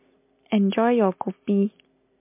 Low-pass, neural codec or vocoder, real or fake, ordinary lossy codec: 3.6 kHz; none; real; MP3, 24 kbps